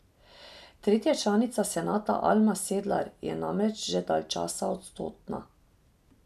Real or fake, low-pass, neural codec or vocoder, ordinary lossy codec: real; 14.4 kHz; none; none